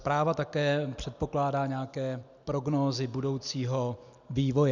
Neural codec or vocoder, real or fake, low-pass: none; real; 7.2 kHz